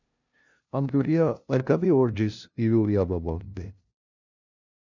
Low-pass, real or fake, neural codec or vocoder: 7.2 kHz; fake; codec, 16 kHz, 0.5 kbps, FunCodec, trained on LibriTTS, 25 frames a second